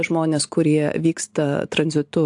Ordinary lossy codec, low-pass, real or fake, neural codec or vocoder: AAC, 64 kbps; 10.8 kHz; real; none